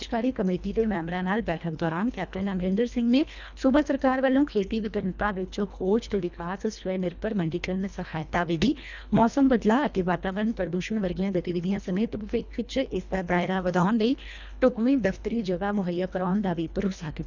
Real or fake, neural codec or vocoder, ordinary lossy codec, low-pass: fake; codec, 24 kHz, 1.5 kbps, HILCodec; none; 7.2 kHz